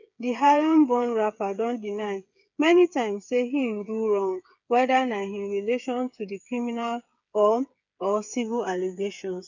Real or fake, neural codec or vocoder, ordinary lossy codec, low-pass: fake; codec, 16 kHz, 8 kbps, FreqCodec, smaller model; none; 7.2 kHz